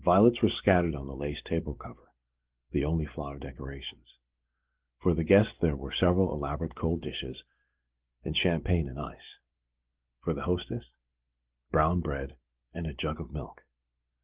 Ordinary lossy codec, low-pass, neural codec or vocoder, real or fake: Opus, 16 kbps; 3.6 kHz; none; real